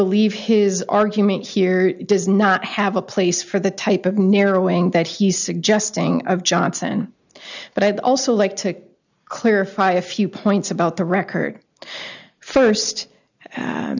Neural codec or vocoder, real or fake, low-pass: none; real; 7.2 kHz